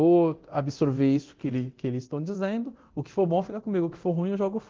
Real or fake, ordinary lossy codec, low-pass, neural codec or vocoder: fake; Opus, 16 kbps; 7.2 kHz; codec, 24 kHz, 0.9 kbps, DualCodec